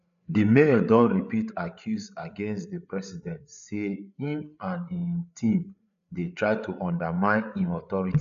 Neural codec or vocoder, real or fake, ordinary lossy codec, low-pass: codec, 16 kHz, 8 kbps, FreqCodec, larger model; fake; none; 7.2 kHz